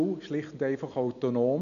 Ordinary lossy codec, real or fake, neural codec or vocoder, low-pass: none; real; none; 7.2 kHz